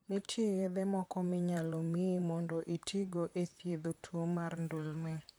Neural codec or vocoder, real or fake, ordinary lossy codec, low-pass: vocoder, 44.1 kHz, 128 mel bands, Pupu-Vocoder; fake; none; none